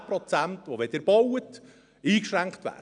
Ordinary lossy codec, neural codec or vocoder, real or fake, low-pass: MP3, 96 kbps; none; real; 9.9 kHz